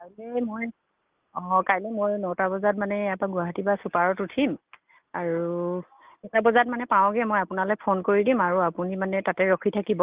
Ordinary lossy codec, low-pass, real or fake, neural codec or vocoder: Opus, 32 kbps; 3.6 kHz; real; none